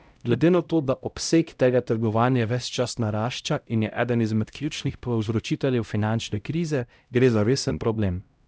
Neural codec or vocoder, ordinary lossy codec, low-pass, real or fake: codec, 16 kHz, 0.5 kbps, X-Codec, HuBERT features, trained on LibriSpeech; none; none; fake